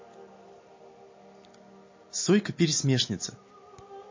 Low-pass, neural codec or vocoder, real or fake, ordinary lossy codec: 7.2 kHz; none; real; MP3, 32 kbps